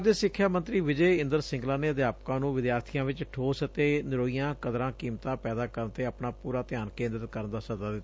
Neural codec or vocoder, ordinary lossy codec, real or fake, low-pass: none; none; real; none